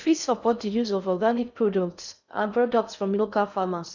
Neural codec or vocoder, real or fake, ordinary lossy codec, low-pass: codec, 16 kHz in and 24 kHz out, 0.6 kbps, FocalCodec, streaming, 4096 codes; fake; none; 7.2 kHz